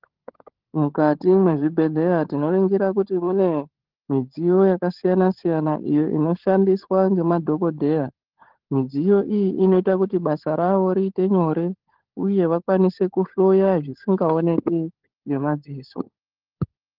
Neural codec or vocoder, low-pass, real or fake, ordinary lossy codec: codec, 16 kHz, 16 kbps, FunCodec, trained on LibriTTS, 50 frames a second; 5.4 kHz; fake; Opus, 16 kbps